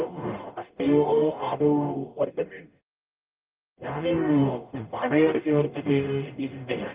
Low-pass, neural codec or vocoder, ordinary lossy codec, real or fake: 3.6 kHz; codec, 44.1 kHz, 0.9 kbps, DAC; Opus, 24 kbps; fake